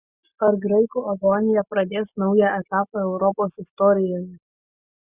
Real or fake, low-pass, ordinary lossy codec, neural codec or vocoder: real; 3.6 kHz; Opus, 64 kbps; none